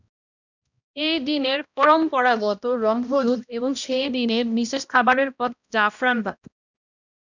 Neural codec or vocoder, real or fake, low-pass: codec, 16 kHz, 1 kbps, X-Codec, HuBERT features, trained on balanced general audio; fake; 7.2 kHz